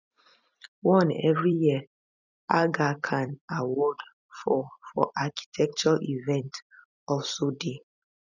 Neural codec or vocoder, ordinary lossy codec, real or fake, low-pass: vocoder, 44.1 kHz, 128 mel bands every 256 samples, BigVGAN v2; none; fake; 7.2 kHz